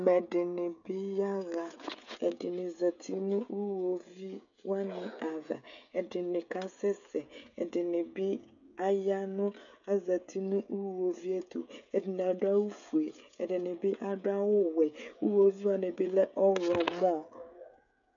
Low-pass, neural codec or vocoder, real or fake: 7.2 kHz; codec, 16 kHz, 16 kbps, FreqCodec, smaller model; fake